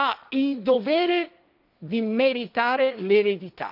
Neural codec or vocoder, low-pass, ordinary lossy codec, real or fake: codec, 16 kHz, 1.1 kbps, Voila-Tokenizer; 5.4 kHz; none; fake